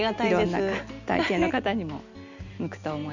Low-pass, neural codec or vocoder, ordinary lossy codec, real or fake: 7.2 kHz; none; none; real